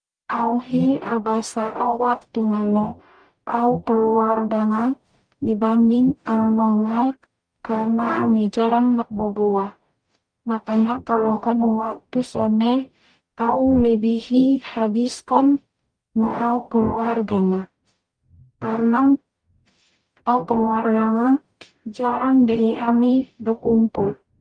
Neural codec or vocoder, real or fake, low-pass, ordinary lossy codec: codec, 44.1 kHz, 0.9 kbps, DAC; fake; 9.9 kHz; Opus, 32 kbps